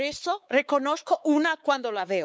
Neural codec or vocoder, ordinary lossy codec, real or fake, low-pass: codec, 16 kHz, 4 kbps, X-Codec, WavLM features, trained on Multilingual LibriSpeech; none; fake; none